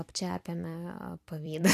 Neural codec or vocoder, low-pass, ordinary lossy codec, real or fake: vocoder, 44.1 kHz, 128 mel bands every 256 samples, BigVGAN v2; 14.4 kHz; AAC, 64 kbps; fake